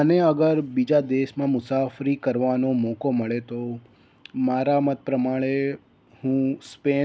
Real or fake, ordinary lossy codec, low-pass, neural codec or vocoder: real; none; none; none